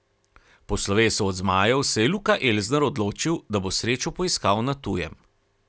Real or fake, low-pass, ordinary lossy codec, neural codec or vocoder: real; none; none; none